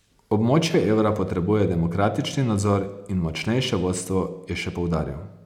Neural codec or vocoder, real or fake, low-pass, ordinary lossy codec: none; real; 19.8 kHz; none